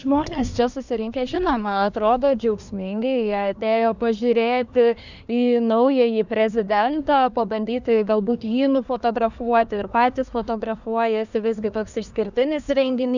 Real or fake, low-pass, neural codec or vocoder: fake; 7.2 kHz; codec, 24 kHz, 1 kbps, SNAC